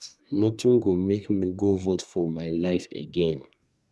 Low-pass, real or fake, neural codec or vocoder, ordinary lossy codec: none; fake; codec, 24 kHz, 1 kbps, SNAC; none